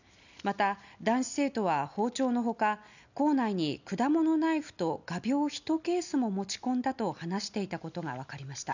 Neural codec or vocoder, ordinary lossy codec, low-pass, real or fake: none; none; 7.2 kHz; real